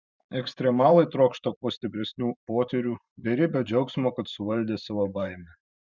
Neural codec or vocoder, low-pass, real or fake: vocoder, 44.1 kHz, 128 mel bands every 512 samples, BigVGAN v2; 7.2 kHz; fake